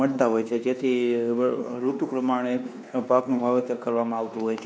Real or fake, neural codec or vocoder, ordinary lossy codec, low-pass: fake; codec, 16 kHz, 2 kbps, X-Codec, WavLM features, trained on Multilingual LibriSpeech; none; none